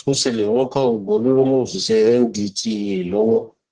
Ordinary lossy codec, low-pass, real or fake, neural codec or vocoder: Opus, 16 kbps; 9.9 kHz; fake; codec, 44.1 kHz, 1.7 kbps, Pupu-Codec